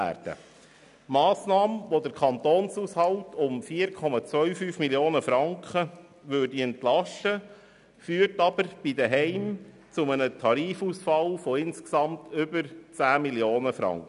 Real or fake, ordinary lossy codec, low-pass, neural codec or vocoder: real; none; 10.8 kHz; none